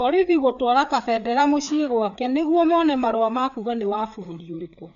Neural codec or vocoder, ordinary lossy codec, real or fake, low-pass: codec, 16 kHz, 4 kbps, FreqCodec, larger model; none; fake; 7.2 kHz